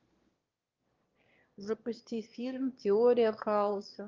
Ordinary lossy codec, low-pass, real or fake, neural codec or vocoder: Opus, 32 kbps; 7.2 kHz; fake; autoencoder, 22.05 kHz, a latent of 192 numbers a frame, VITS, trained on one speaker